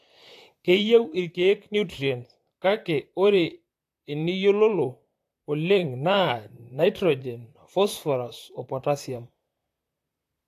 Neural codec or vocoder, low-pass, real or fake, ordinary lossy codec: vocoder, 44.1 kHz, 128 mel bands, Pupu-Vocoder; 14.4 kHz; fake; AAC, 64 kbps